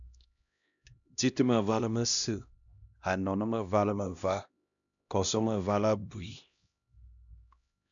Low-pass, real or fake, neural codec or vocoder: 7.2 kHz; fake; codec, 16 kHz, 1 kbps, X-Codec, HuBERT features, trained on LibriSpeech